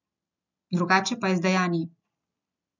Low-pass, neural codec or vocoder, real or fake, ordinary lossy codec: 7.2 kHz; none; real; none